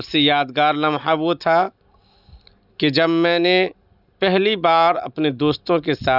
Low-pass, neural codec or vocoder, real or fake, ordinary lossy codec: 5.4 kHz; none; real; none